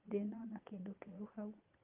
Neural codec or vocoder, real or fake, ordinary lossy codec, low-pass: vocoder, 22.05 kHz, 80 mel bands, Vocos; fake; Opus, 24 kbps; 3.6 kHz